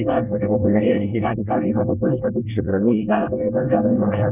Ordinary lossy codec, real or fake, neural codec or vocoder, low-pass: none; fake; codec, 24 kHz, 1 kbps, SNAC; 3.6 kHz